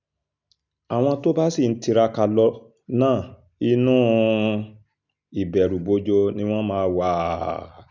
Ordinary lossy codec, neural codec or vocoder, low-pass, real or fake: none; none; 7.2 kHz; real